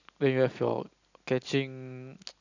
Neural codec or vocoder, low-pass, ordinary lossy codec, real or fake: none; 7.2 kHz; none; real